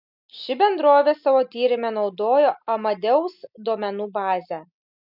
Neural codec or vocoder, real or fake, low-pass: none; real; 5.4 kHz